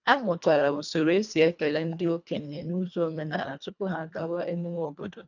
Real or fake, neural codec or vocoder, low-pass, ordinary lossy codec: fake; codec, 24 kHz, 1.5 kbps, HILCodec; 7.2 kHz; none